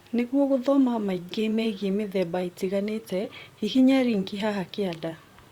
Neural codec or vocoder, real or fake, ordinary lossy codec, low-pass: vocoder, 44.1 kHz, 128 mel bands, Pupu-Vocoder; fake; Opus, 64 kbps; 19.8 kHz